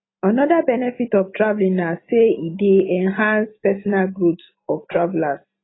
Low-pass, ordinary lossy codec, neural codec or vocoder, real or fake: 7.2 kHz; AAC, 16 kbps; none; real